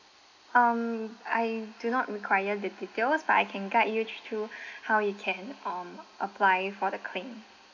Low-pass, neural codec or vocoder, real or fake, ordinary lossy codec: 7.2 kHz; none; real; none